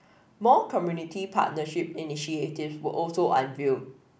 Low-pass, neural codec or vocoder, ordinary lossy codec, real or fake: none; none; none; real